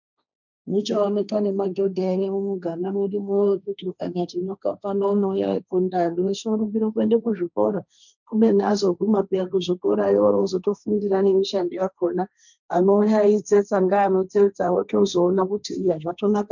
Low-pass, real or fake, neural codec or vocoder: 7.2 kHz; fake; codec, 16 kHz, 1.1 kbps, Voila-Tokenizer